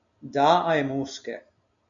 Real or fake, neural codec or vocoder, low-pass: real; none; 7.2 kHz